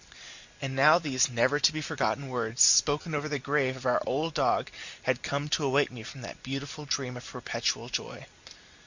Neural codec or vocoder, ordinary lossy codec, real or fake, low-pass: none; Opus, 64 kbps; real; 7.2 kHz